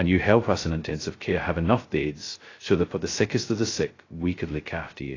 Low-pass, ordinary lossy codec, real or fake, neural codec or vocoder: 7.2 kHz; AAC, 32 kbps; fake; codec, 16 kHz, 0.2 kbps, FocalCodec